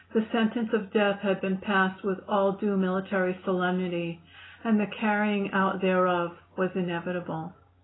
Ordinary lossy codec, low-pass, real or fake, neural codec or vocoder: AAC, 16 kbps; 7.2 kHz; real; none